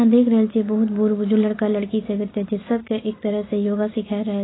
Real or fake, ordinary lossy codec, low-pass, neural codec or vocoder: real; AAC, 16 kbps; 7.2 kHz; none